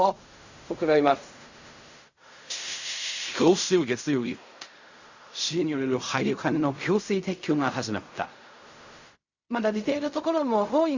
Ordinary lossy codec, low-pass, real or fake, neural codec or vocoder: Opus, 64 kbps; 7.2 kHz; fake; codec, 16 kHz in and 24 kHz out, 0.4 kbps, LongCat-Audio-Codec, fine tuned four codebook decoder